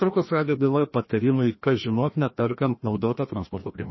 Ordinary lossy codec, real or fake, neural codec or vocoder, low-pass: MP3, 24 kbps; fake; codec, 16 kHz, 1 kbps, FreqCodec, larger model; 7.2 kHz